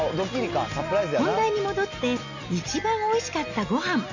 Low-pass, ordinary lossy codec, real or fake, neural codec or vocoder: 7.2 kHz; none; real; none